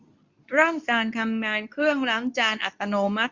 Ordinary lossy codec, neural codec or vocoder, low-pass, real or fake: Opus, 64 kbps; codec, 24 kHz, 0.9 kbps, WavTokenizer, medium speech release version 2; 7.2 kHz; fake